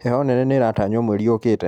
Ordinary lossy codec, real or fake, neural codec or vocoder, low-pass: none; real; none; 19.8 kHz